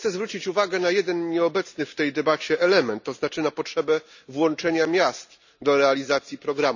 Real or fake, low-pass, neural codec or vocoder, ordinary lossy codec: real; 7.2 kHz; none; none